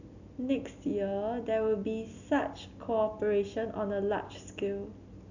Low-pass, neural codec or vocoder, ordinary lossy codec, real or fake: 7.2 kHz; none; none; real